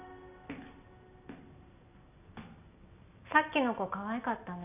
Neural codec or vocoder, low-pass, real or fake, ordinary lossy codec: none; 3.6 kHz; real; none